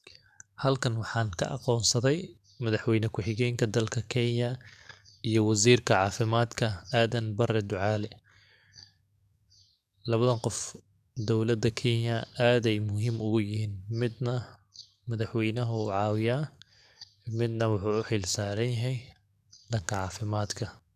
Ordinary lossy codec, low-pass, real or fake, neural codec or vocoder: none; 14.4 kHz; fake; codec, 44.1 kHz, 7.8 kbps, DAC